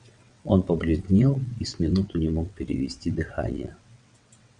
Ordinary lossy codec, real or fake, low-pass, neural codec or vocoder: MP3, 96 kbps; fake; 9.9 kHz; vocoder, 22.05 kHz, 80 mel bands, WaveNeXt